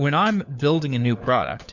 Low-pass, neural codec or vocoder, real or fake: 7.2 kHz; codec, 16 kHz, 4 kbps, FunCodec, trained on LibriTTS, 50 frames a second; fake